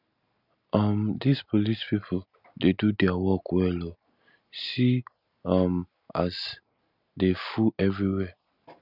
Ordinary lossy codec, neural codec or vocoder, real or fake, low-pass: none; none; real; 5.4 kHz